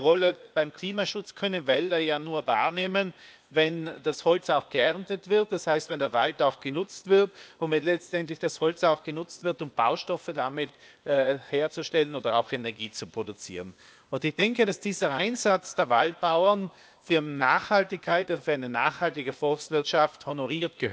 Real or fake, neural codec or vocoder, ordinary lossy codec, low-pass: fake; codec, 16 kHz, 0.8 kbps, ZipCodec; none; none